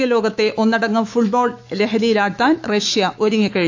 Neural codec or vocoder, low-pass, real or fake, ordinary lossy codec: autoencoder, 48 kHz, 128 numbers a frame, DAC-VAE, trained on Japanese speech; 7.2 kHz; fake; AAC, 48 kbps